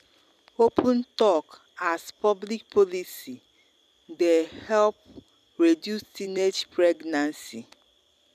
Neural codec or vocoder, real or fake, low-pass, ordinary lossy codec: vocoder, 44.1 kHz, 128 mel bands every 256 samples, BigVGAN v2; fake; 14.4 kHz; none